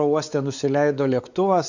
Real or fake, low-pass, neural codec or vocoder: fake; 7.2 kHz; codec, 16 kHz, 4 kbps, X-Codec, WavLM features, trained on Multilingual LibriSpeech